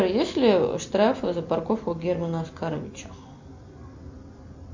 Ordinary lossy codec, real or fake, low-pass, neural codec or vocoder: MP3, 64 kbps; real; 7.2 kHz; none